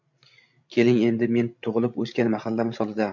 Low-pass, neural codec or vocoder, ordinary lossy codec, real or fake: 7.2 kHz; codec, 16 kHz, 16 kbps, FreqCodec, larger model; MP3, 48 kbps; fake